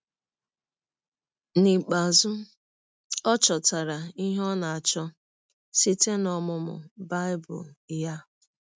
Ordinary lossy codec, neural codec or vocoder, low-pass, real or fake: none; none; none; real